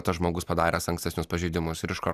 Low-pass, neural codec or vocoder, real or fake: 14.4 kHz; none; real